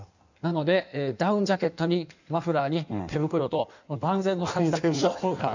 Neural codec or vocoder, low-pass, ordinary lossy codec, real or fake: codec, 16 kHz in and 24 kHz out, 1.1 kbps, FireRedTTS-2 codec; 7.2 kHz; none; fake